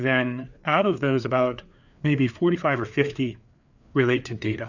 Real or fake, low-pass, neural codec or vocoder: fake; 7.2 kHz; codec, 16 kHz, 4 kbps, FreqCodec, larger model